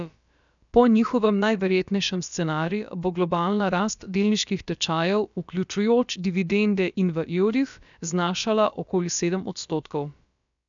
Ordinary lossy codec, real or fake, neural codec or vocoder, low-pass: none; fake; codec, 16 kHz, about 1 kbps, DyCAST, with the encoder's durations; 7.2 kHz